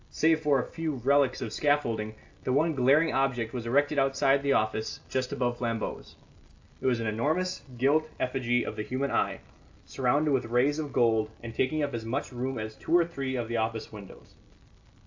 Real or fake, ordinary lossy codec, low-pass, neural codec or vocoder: real; AAC, 48 kbps; 7.2 kHz; none